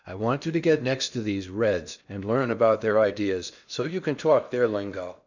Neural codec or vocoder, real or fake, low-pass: codec, 16 kHz in and 24 kHz out, 0.8 kbps, FocalCodec, streaming, 65536 codes; fake; 7.2 kHz